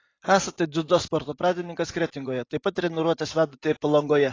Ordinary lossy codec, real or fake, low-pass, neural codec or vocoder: AAC, 32 kbps; real; 7.2 kHz; none